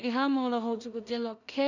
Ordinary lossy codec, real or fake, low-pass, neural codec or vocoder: none; fake; 7.2 kHz; codec, 16 kHz in and 24 kHz out, 0.4 kbps, LongCat-Audio-Codec, two codebook decoder